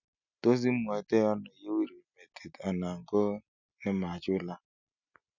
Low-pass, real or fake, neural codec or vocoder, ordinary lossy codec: 7.2 kHz; real; none; none